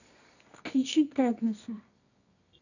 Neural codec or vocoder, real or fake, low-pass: codec, 24 kHz, 0.9 kbps, WavTokenizer, medium music audio release; fake; 7.2 kHz